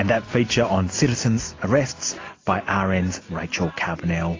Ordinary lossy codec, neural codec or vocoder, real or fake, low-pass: AAC, 32 kbps; none; real; 7.2 kHz